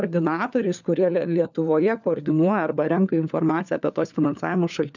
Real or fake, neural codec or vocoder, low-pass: fake; codec, 16 kHz, 4 kbps, FunCodec, trained on LibriTTS, 50 frames a second; 7.2 kHz